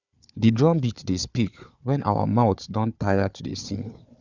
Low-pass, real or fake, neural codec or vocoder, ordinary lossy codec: 7.2 kHz; fake; codec, 16 kHz, 4 kbps, FunCodec, trained on Chinese and English, 50 frames a second; none